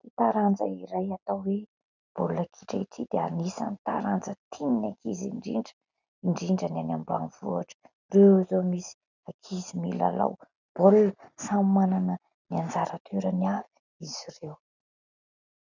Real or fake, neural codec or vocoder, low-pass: real; none; 7.2 kHz